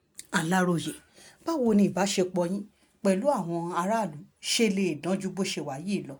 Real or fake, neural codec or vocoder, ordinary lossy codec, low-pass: real; none; none; none